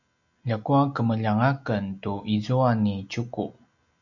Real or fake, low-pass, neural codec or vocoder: real; 7.2 kHz; none